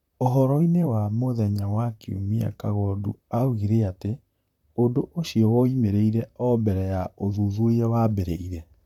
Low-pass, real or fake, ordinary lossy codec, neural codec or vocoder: 19.8 kHz; fake; none; vocoder, 44.1 kHz, 128 mel bands, Pupu-Vocoder